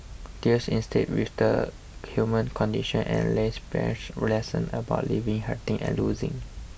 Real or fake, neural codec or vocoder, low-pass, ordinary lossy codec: real; none; none; none